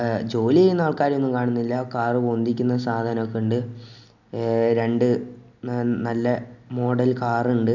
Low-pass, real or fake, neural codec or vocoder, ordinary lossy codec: 7.2 kHz; real; none; none